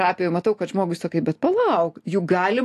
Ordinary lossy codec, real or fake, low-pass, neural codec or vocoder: AAC, 64 kbps; real; 14.4 kHz; none